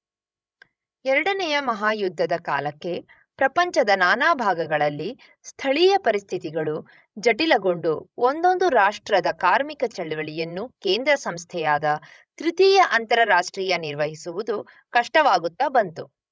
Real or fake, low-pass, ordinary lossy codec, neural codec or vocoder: fake; none; none; codec, 16 kHz, 16 kbps, FreqCodec, larger model